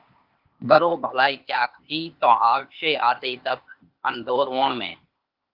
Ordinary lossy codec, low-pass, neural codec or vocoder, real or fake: Opus, 24 kbps; 5.4 kHz; codec, 16 kHz, 0.8 kbps, ZipCodec; fake